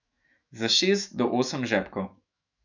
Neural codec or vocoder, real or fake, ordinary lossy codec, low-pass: autoencoder, 48 kHz, 128 numbers a frame, DAC-VAE, trained on Japanese speech; fake; none; 7.2 kHz